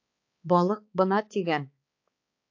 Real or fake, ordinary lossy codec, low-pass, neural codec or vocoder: fake; AAC, 48 kbps; 7.2 kHz; codec, 16 kHz, 4 kbps, X-Codec, HuBERT features, trained on balanced general audio